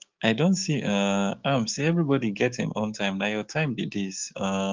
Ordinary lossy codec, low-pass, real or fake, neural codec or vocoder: Opus, 32 kbps; 7.2 kHz; fake; codec, 16 kHz, 6 kbps, DAC